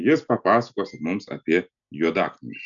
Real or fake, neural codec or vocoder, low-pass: real; none; 7.2 kHz